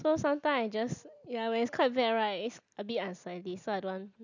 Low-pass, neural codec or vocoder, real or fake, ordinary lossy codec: 7.2 kHz; none; real; none